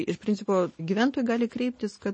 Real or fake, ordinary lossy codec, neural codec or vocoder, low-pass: real; MP3, 32 kbps; none; 9.9 kHz